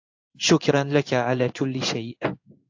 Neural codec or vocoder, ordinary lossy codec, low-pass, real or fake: codec, 16 kHz in and 24 kHz out, 1 kbps, XY-Tokenizer; AAC, 48 kbps; 7.2 kHz; fake